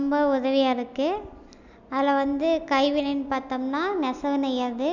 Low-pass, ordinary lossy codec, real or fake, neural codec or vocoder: 7.2 kHz; none; real; none